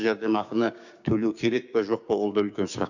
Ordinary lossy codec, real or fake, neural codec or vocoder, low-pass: none; fake; codec, 16 kHz, 6 kbps, DAC; 7.2 kHz